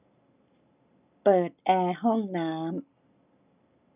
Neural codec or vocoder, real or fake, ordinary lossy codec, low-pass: none; real; none; 3.6 kHz